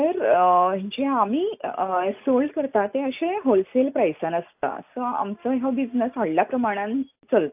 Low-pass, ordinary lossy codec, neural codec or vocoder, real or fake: 3.6 kHz; AAC, 32 kbps; none; real